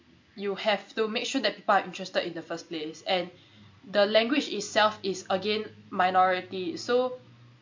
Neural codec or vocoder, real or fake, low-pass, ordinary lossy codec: none; real; 7.2 kHz; MP3, 48 kbps